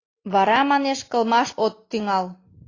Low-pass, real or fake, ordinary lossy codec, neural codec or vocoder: 7.2 kHz; real; AAC, 32 kbps; none